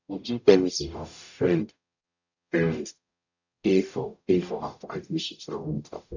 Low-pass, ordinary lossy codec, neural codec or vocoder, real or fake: 7.2 kHz; none; codec, 44.1 kHz, 0.9 kbps, DAC; fake